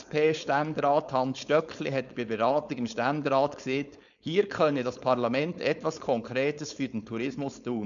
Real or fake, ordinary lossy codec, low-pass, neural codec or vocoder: fake; none; 7.2 kHz; codec, 16 kHz, 4.8 kbps, FACodec